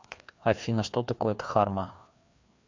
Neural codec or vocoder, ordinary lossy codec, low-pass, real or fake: codec, 16 kHz, 2 kbps, FreqCodec, larger model; MP3, 64 kbps; 7.2 kHz; fake